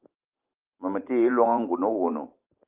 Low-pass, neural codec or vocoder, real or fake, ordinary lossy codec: 3.6 kHz; vocoder, 44.1 kHz, 128 mel bands every 512 samples, BigVGAN v2; fake; Opus, 24 kbps